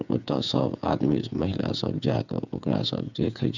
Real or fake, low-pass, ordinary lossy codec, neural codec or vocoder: fake; 7.2 kHz; none; codec, 16 kHz, 8 kbps, FreqCodec, smaller model